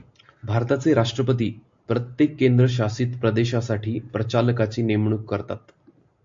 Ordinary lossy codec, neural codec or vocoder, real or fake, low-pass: AAC, 64 kbps; none; real; 7.2 kHz